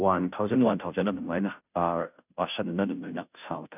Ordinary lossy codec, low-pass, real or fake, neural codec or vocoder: none; 3.6 kHz; fake; codec, 16 kHz, 0.5 kbps, FunCodec, trained on Chinese and English, 25 frames a second